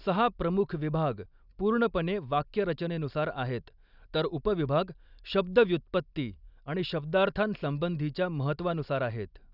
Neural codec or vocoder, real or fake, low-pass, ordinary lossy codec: none; real; 5.4 kHz; none